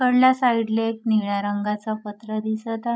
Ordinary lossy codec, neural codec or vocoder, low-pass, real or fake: none; codec, 16 kHz, 16 kbps, FreqCodec, larger model; none; fake